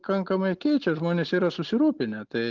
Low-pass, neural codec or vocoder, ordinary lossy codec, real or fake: 7.2 kHz; none; Opus, 24 kbps; real